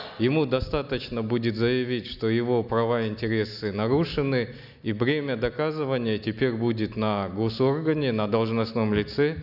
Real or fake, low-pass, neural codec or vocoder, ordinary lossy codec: real; 5.4 kHz; none; none